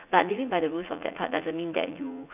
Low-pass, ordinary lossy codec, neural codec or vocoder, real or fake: 3.6 kHz; none; vocoder, 44.1 kHz, 80 mel bands, Vocos; fake